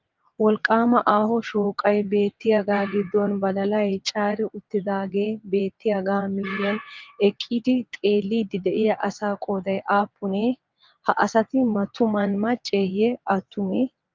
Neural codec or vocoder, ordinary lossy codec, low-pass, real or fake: vocoder, 22.05 kHz, 80 mel bands, WaveNeXt; Opus, 24 kbps; 7.2 kHz; fake